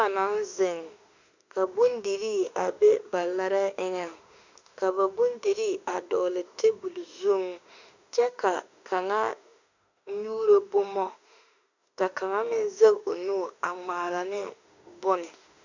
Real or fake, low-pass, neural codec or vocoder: fake; 7.2 kHz; autoencoder, 48 kHz, 32 numbers a frame, DAC-VAE, trained on Japanese speech